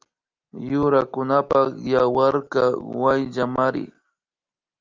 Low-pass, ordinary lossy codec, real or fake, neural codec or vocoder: 7.2 kHz; Opus, 24 kbps; real; none